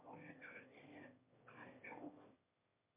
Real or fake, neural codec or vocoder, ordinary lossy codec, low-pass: fake; autoencoder, 22.05 kHz, a latent of 192 numbers a frame, VITS, trained on one speaker; MP3, 24 kbps; 3.6 kHz